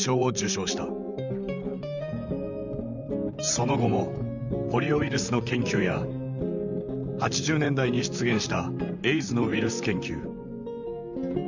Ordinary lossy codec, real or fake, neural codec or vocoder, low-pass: none; fake; vocoder, 44.1 kHz, 128 mel bands, Pupu-Vocoder; 7.2 kHz